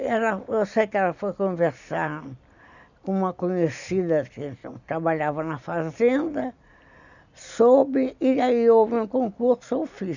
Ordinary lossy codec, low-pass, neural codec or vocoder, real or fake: none; 7.2 kHz; none; real